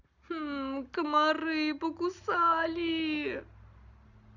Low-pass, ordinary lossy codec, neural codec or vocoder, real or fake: 7.2 kHz; none; none; real